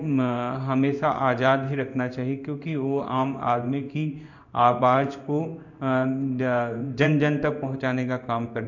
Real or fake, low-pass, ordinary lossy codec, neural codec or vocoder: fake; 7.2 kHz; none; codec, 16 kHz in and 24 kHz out, 1 kbps, XY-Tokenizer